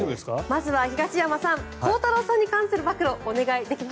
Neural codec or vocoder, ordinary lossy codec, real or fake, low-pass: none; none; real; none